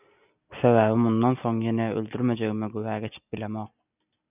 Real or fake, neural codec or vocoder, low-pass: real; none; 3.6 kHz